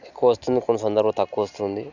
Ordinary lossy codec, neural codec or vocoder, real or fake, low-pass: none; none; real; 7.2 kHz